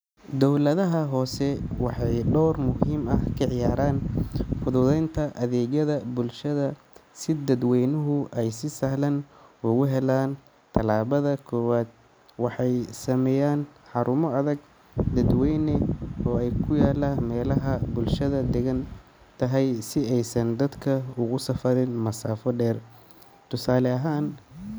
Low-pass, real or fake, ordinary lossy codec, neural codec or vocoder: none; real; none; none